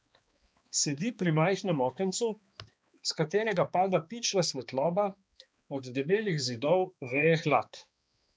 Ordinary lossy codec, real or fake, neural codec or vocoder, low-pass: none; fake; codec, 16 kHz, 2 kbps, X-Codec, HuBERT features, trained on balanced general audio; none